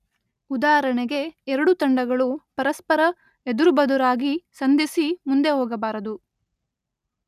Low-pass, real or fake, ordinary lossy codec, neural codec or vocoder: 14.4 kHz; real; none; none